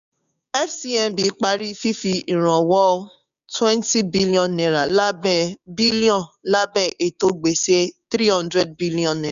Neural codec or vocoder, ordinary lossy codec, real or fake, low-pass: codec, 16 kHz, 6 kbps, DAC; none; fake; 7.2 kHz